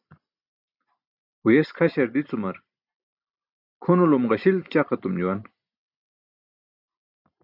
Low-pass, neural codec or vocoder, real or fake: 5.4 kHz; none; real